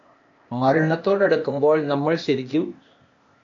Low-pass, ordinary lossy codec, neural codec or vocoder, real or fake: 7.2 kHz; AAC, 48 kbps; codec, 16 kHz, 0.8 kbps, ZipCodec; fake